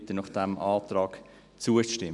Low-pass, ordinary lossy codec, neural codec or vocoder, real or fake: 10.8 kHz; none; none; real